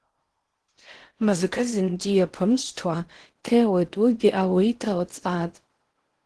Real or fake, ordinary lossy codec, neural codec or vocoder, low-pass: fake; Opus, 16 kbps; codec, 16 kHz in and 24 kHz out, 0.6 kbps, FocalCodec, streaming, 4096 codes; 10.8 kHz